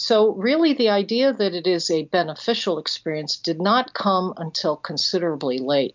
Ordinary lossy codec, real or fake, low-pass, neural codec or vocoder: MP3, 64 kbps; real; 7.2 kHz; none